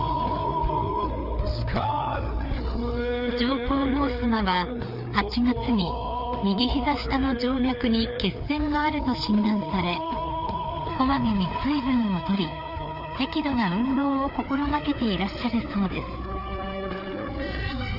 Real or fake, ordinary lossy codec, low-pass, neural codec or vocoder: fake; none; 5.4 kHz; codec, 16 kHz, 4 kbps, FreqCodec, larger model